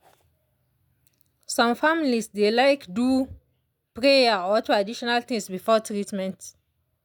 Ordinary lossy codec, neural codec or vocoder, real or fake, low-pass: none; none; real; 19.8 kHz